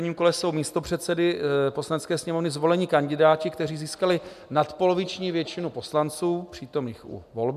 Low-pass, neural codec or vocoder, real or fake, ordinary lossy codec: 14.4 kHz; none; real; MP3, 96 kbps